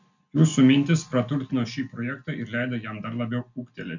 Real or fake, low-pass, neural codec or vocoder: real; 7.2 kHz; none